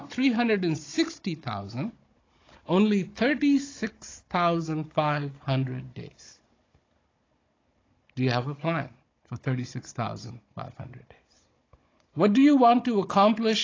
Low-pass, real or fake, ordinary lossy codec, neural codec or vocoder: 7.2 kHz; fake; AAC, 32 kbps; codec, 16 kHz, 16 kbps, FunCodec, trained on Chinese and English, 50 frames a second